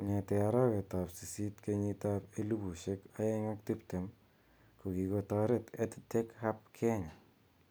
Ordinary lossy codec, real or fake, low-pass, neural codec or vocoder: none; real; none; none